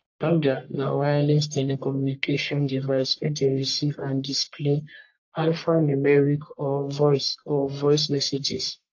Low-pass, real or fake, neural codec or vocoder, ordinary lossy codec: 7.2 kHz; fake; codec, 44.1 kHz, 1.7 kbps, Pupu-Codec; AAC, 48 kbps